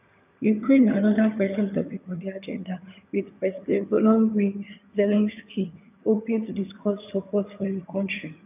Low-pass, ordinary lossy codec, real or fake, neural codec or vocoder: 3.6 kHz; none; fake; vocoder, 22.05 kHz, 80 mel bands, HiFi-GAN